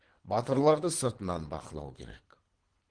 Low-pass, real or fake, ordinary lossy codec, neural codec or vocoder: 9.9 kHz; fake; Opus, 16 kbps; codec, 24 kHz, 3 kbps, HILCodec